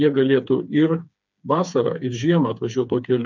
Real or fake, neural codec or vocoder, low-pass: fake; codec, 16 kHz, 4 kbps, FreqCodec, smaller model; 7.2 kHz